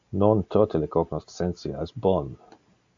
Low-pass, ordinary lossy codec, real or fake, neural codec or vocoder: 7.2 kHz; AAC, 64 kbps; real; none